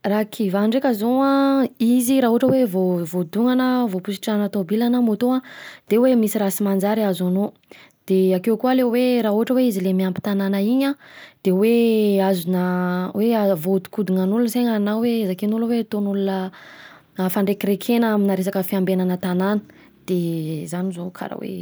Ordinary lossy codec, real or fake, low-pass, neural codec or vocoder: none; real; none; none